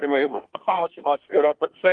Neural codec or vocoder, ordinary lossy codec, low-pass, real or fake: codec, 24 kHz, 1 kbps, SNAC; Opus, 24 kbps; 9.9 kHz; fake